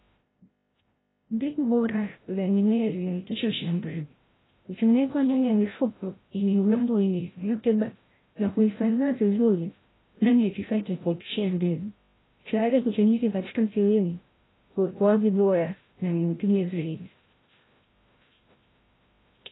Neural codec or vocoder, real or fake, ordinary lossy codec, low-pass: codec, 16 kHz, 0.5 kbps, FreqCodec, larger model; fake; AAC, 16 kbps; 7.2 kHz